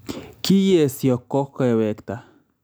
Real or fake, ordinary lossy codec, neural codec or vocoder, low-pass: real; none; none; none